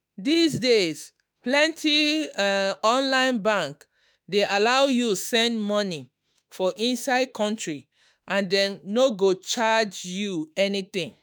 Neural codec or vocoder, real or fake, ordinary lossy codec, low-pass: autoencoder, 48 kHz, 32 numbers a frame, DAC-VAE, trained on Japanese speech; fake; none; none